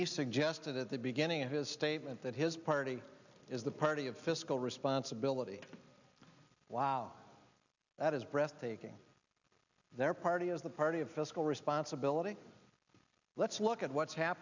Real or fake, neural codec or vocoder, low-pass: real; none; 7.2 kHz